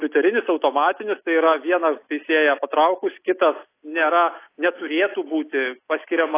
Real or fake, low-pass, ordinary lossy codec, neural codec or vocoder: real; 3.6 kHz; AAC, 24 kbps; none